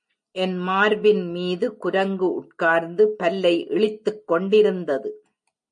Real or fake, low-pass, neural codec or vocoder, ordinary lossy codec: real; 9.9 kHz; none; MP3, 48 kbps